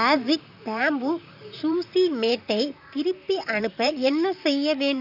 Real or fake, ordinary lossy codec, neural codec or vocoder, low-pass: fake; none; vocoder, 44.1 kHz, 128 mel bands, Pupu-Vocoder; 5.4 kHz